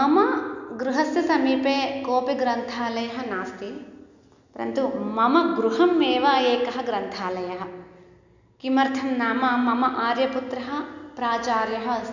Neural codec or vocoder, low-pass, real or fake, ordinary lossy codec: none; 7.2 kHz; real; none